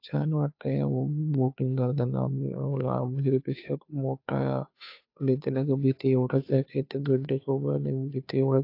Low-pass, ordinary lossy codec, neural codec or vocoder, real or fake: 5.4 kHz; AAC, 32 kbps; codec, 16 kHz, 2 kbps, FunCodec, trained on Chinese and English, 25 frames a second; fake